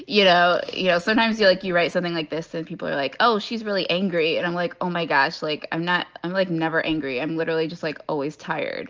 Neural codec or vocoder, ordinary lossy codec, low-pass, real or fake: none; Opus, 24 kbps; 7.2 kHz; real